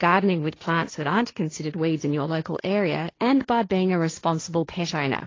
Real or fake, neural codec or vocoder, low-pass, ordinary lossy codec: fake; codec, 16 kHz, 1.1 kbps, Voila-Tokenizer; 7.2 kHz; AAC, 32 kbps